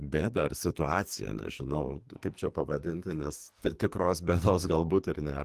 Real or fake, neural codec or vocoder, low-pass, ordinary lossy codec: fake; codec, 44.1 kHz, 2.6 kbps, SNAC; 14.4 kHz; Opus, 24 kbps